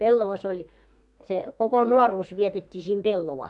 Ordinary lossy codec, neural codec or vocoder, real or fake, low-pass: AAC, 64 kbps; codec, 32 kHz, 1.9 kbps, SNAC; fake; 10.8 kHz